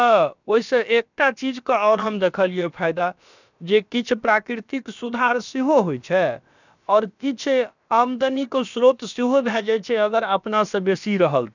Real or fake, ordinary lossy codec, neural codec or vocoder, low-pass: fake; none; codec, 16 kHz, about 1 kbps, DyCAST, with the encoder's durations; 7.2 kHz